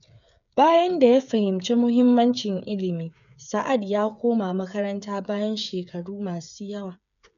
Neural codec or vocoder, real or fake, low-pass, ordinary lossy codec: codec, 16 kHz, 8 kbps, FreqCodec, smaller model; fake; 7.2 kHz; none